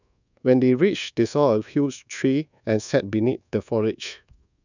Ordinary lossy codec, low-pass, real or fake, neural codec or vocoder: none; 7.2 kHz; fake; codec, 24 kHz, 1.2 kbps, DualCodec